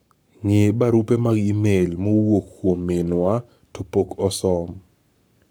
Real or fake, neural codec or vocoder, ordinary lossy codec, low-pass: fake; codec, 44.1 kHz, 7.8 kbps, Pupu-Codec; none; none